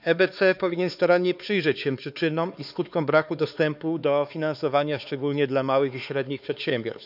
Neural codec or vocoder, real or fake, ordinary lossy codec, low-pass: codec, 16 kHz, 4 kbps, X-Codec, HuBERT features, trained on LibriSpeech; fake; none; 5.4 kHz